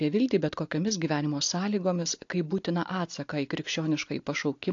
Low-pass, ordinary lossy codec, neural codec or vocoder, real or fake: 7.2 kHz; AAC, 48 kbps; none; real